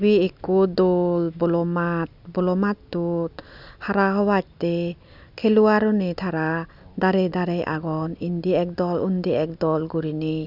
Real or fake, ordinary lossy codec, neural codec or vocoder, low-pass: real; none; none; 5.4 kHz